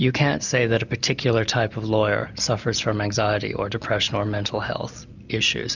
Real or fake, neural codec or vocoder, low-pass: real; none; 7.2 kHz